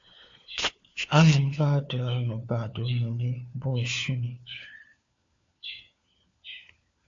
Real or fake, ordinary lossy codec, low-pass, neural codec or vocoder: fake; AAC, 48 kbps; 7.2 kHz; codec, 16 kHz, 4 kbps, FunCodec, trained on LibriTTS, 50 frames a second